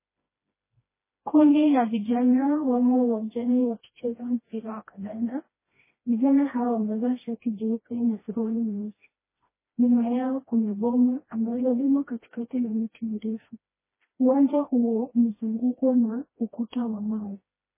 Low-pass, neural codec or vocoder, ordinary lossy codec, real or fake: 3.6 kHz; codec, 16 kHz, 1 kbps, FreqCodec, smaller model; MP3, 16 kbps; fake